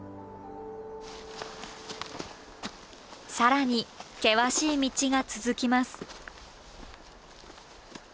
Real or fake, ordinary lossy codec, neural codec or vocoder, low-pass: real; none; none; none